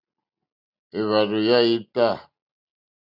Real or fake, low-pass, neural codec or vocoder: real; 5.4 kHz; none